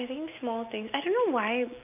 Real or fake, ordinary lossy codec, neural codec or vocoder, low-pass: real; none; none; 3.6 kHz